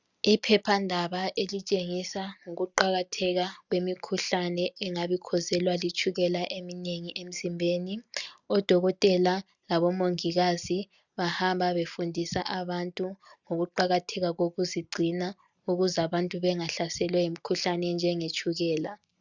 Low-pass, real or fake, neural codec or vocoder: 7.2 kHz; real; none